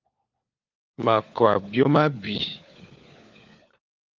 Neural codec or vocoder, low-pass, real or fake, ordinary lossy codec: codec, 16 kHz, 4 kbps, FunCodec, trained on LibriTTS, 50 frames a second; 7.2 kHz; fake; Opus, 24 kbps